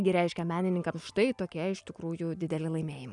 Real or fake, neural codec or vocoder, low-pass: real; none; 10.8 kHz